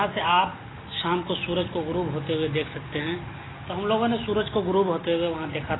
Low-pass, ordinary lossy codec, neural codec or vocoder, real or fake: 7.2 kHz; AAC, 16 kbps; none; real